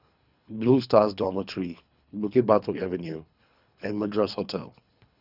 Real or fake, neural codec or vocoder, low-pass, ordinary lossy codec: fake; codec, 24 kHz, 3 kbps, HILCodec; 5.4 kHz; none